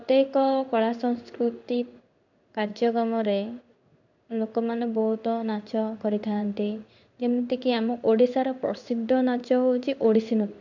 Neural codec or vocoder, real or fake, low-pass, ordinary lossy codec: codec, 16 kHz in and 24 kHz out, 1 kbps, XY-Tokenizer; fake; 7.2 kHz; none